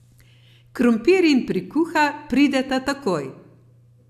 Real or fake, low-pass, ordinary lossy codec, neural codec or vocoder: real; 14.4 kHz; AAC, 96 kbps; none